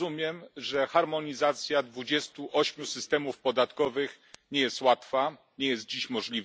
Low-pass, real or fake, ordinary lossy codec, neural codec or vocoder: none; real; none; none